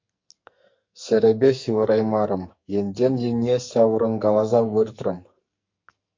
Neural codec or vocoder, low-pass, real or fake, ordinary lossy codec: codec, 44.1 kHz, 2.6 kbps, SNAC; 7.2 kHz; fake; MP3, 48 kbps